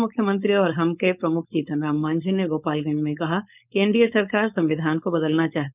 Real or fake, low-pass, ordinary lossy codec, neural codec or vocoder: fake; 3.6 kHz; none; codec, 16 kHz, 4.8 kbps, FACodec